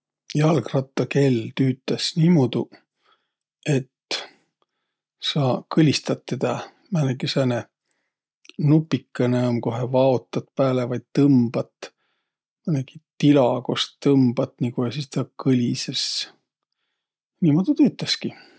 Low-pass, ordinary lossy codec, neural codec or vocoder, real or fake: none; none; none; real